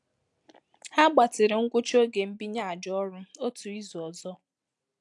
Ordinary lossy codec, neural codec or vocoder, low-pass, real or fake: AAC, 64 kbps; none; 10.8 kHz; real